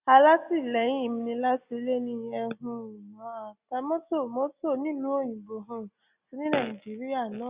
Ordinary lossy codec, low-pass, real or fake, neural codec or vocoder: Opus, 64 kbps; 3.6 kHz; real; none